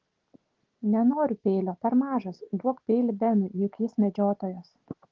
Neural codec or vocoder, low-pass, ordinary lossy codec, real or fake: none; 7.2 kHz; Opus, 16 kbps; real